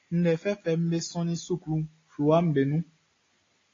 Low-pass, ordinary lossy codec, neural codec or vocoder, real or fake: 7.2 kHz; AAC, 32 kbps; none; real